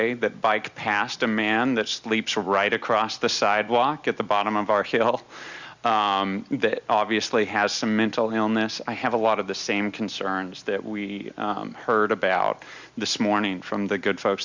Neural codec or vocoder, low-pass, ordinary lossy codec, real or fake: none; 7.2 kHz; Opus, 64 kbps; real